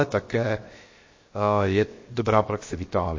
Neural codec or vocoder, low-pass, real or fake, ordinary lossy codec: codec, 16 kHz, 0.7 kbps, FocalCodec; 7.2 kHz; fake; MP3, 32 kbps